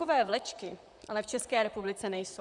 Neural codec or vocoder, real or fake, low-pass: vocoder, 44.1 kHz, 128 mel bands, Pupu-Vocoder; fake; 10.8 kHz